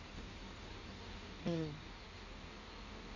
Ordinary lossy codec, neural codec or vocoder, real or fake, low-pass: none; codec, 16 kHz in and 24 kHz out, 1.1 kbps, FireRedTTS-2 codec; fake; 7.2 kHz